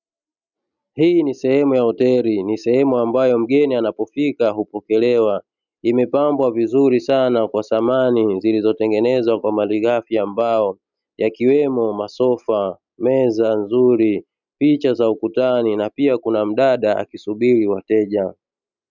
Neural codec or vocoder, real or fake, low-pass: none; real; 7.2 kHz